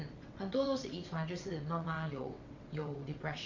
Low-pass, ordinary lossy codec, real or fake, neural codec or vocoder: 7.2 kHz; MP3, 48 kbps; fake; vocoder, 22.05 kHz, 80 mel bands, WaveNeXt